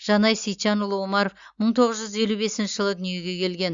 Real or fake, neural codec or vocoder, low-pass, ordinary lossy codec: real; none; 7.2 kHz; Opus, 64 kbps